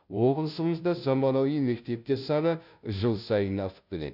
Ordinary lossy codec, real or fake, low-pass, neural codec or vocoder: none; fake; 5.4 kHz; codec, 16 kHz, 0.5 kbps, FunCodec, trained on Chinese and English, 25 frames a second